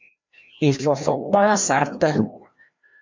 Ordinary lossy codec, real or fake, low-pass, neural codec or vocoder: MP3, 64 kbps; fake; 7.2 kHz; codec, 16 kHz, 1 kbps, FreqCodec, larger model